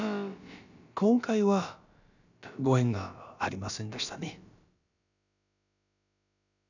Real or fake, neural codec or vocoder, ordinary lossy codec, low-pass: fake; codec, 16 kHz, about 1 kbps, DyCAST, with the encoder's durations; none; 7.2 kHz